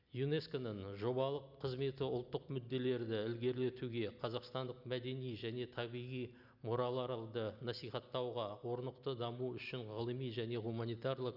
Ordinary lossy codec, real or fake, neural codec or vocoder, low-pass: none; real; none; 5.4 kHz